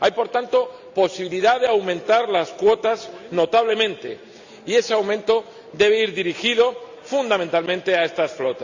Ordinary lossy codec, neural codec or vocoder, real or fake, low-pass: Opus, 64 kbps; none; real; 7.2 kHz